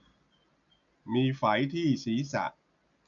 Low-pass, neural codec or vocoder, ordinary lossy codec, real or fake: 7.2 kHz; none; none; real